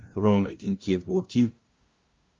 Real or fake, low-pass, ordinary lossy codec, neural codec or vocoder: fake; 7.2 kHz; Opus, 32 kbps; codec, 16 kHz, 0.5 kbps, FunCodec, trained on LibriTTS, 25 frames a second